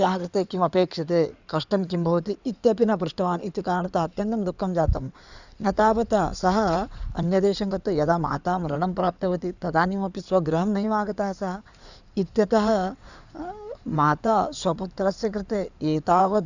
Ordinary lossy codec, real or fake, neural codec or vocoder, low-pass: none; fake; codec, 16 kHz in and 24 kHz out, 2.2 kbps, FireRedTTS-2 codec; 7.2 kHz